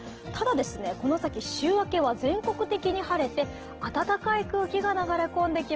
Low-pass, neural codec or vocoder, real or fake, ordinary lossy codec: 7.2 kHz; none; real; Opus, 16 kbps